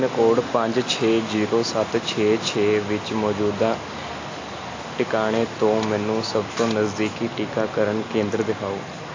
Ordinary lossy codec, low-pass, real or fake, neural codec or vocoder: AAC, 32 kbps; 7.2 kHz; real; none